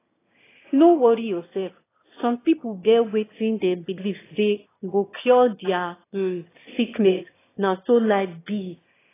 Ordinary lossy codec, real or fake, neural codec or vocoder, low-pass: AAC, 16 kbps; fake; autoencoder, 22.05 kHz, a latent of 192 numbers a frame, VITS, trained on one speaker; 3.6 kHz